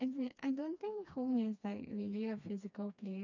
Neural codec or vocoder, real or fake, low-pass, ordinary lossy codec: codec, 16 kHz, 2 kbps, FreqCodec, smaller model; fake; 7.2 kHz; none